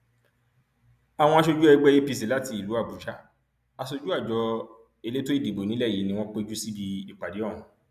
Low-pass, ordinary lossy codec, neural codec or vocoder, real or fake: 14.4 kHz; none; none; real